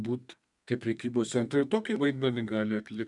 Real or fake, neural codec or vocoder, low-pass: fake; codec, 32 kHz, 1.9 kbps, SNAC; 10.8 kHz